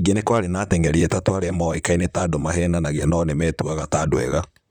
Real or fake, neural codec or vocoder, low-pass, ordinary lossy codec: fake; vocoder, 44.1 kHz, 128 mel bands, Pupu-Vocoder; 19.8 kHz; none